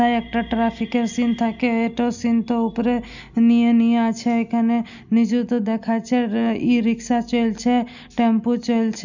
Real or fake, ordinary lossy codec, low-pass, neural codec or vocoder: real; none; 7.2 kHz; none